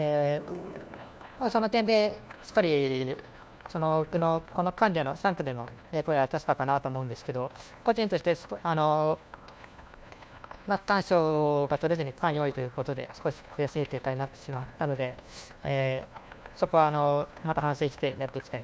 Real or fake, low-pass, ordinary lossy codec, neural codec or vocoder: fake; none; none; codec, 16 kHz, 1 kbps, FunCodec, trained on LibriTTS, 50 frames a second